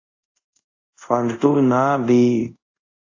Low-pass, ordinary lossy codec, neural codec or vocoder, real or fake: 7.2 kHz; AAC, 48 kbps; codec, 24 kHz, 0.5 kbps, DualCodec; fake